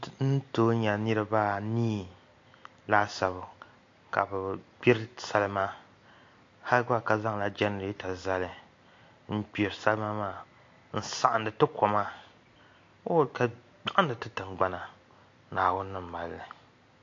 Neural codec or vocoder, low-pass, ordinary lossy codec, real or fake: none; 7.2 kHz; AAC, 48 kbps; real